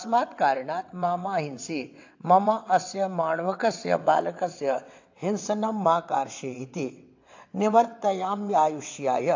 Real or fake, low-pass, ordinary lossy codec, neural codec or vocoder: fake; 7.2 kHz; AAC, 48 kbps; vocoder, 22.05 kHz, 80 mel bands, WaveNeXt